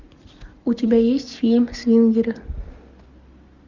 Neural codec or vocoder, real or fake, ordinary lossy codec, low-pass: none; real; Opus, 64 kbps; 7.2 kHz